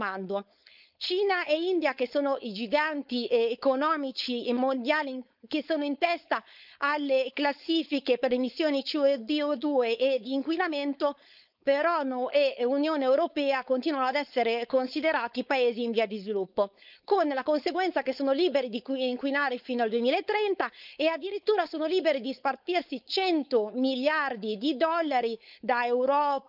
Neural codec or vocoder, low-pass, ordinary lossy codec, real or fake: codec, 16 kHz, 4.8 kbps, FACodec; 5.4 kHz; none; fake